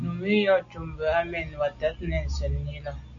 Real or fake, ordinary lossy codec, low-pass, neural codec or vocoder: real; AAC, 64 kbps; 7.2 kHz; none